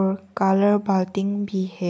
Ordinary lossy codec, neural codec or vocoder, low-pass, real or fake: none; none; none; real